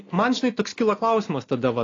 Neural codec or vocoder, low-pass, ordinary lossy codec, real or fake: none; 7.2 kHz; AAC, 32 kbps; real